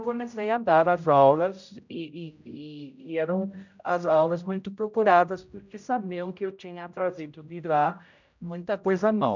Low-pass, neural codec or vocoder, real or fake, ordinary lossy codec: 7.2 kHz; codec, 16 kHz, 0.5 kbps, X-Codec, HuBERT features, trained on general audio; fake; none